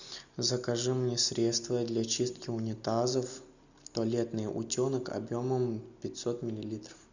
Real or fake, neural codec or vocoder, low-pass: real; none; 7.2 kHz